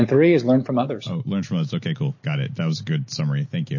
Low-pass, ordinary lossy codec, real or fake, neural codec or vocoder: 7.2 kHz; MP3, 32 kbps; real; none